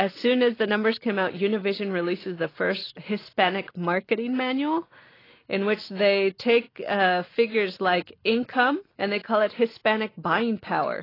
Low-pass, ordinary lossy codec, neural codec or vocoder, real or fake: 5.4 kHz; AAC, 24 kbps; none; real